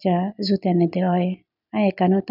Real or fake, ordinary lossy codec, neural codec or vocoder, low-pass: real; none; none; 5.4 kHz